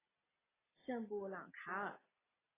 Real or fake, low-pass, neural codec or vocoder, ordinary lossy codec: real; 3.6 kHz; none; AAC, 16 kbps